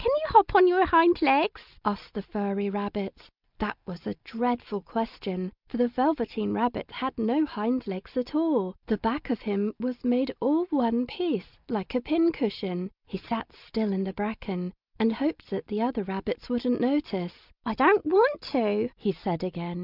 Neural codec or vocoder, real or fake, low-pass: none; real; 5.4 kHz